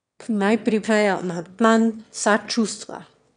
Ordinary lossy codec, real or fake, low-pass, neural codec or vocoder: none; fake; 9.9 kHz; autoencoder, 22.05 kHz, a latent of 192 numbers a frame, VITS, trained on one speaker